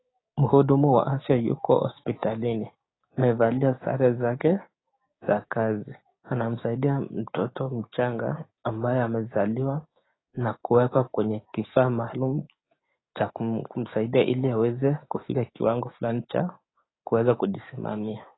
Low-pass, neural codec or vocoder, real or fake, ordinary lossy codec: 7.2 kHz; codec, 16 kHz, 6 kbps, DAC; fake; AAC, 16 kbps